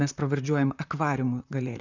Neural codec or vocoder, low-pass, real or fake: vocoder, 44.1 kHz, 128 mel bands, Pupu-Vocoder; 7.2 kHz; fake